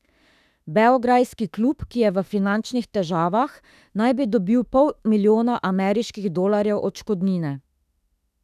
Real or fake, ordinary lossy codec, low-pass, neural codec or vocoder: fake; none; 14.4 kHz; autoencoder, 48 kHz, 32 numbers a frame, DAC-VAE, trained on Japanese speech